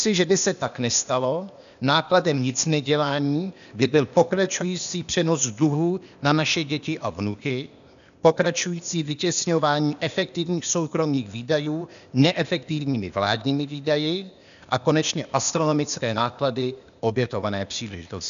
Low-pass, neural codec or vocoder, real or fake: 7.2 kHz; codec, 16 kHz, 0.8 kbps, ZipCodec; fake